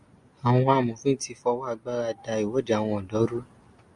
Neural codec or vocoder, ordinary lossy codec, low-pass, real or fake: none; Opus, 64 kbps; 10.8 kHz; real